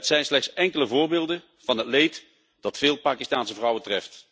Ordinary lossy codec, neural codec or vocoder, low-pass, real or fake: none; none; none; real